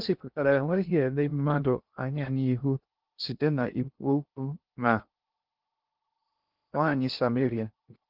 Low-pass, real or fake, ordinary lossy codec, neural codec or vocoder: 5.4 kHz; fake; Opus, 32 kbps; codec, 16 kHz in and 24 kHz out, 0.6 kbps, FocalCodec, streaming, 2048 codes